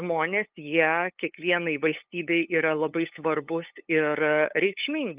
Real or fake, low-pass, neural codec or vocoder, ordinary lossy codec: fake; 3.6 kHz; codec, 16 kHz, 8 kbps, FunCodec, trained on LibriTTS, 25 frames a second; Opus, 32 kbps